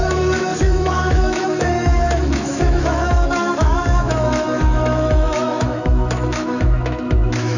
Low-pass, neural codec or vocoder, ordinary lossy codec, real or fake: 7.2 kHz; autoencoder, 48 kHz, 128 numbers a frame, DAC-VAE, trained on Japanese speech; none; fake